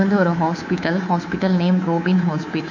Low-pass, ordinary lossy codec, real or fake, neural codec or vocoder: 7.2 kHz; none; fake; codec, 24 kHz, 3.1 kbps, DualCodec